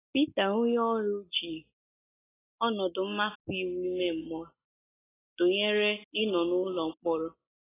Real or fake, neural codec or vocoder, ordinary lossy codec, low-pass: real; none; AAC, 16 kbps; 3.6 kHz